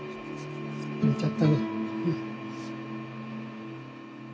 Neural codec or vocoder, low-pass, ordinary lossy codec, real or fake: none; none; none; real